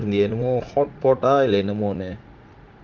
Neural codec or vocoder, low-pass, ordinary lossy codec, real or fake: vocoder, 44.1 kHz, 80 mel bands, Vocos; 7.2 kHz; Opus, 32 kbps; fake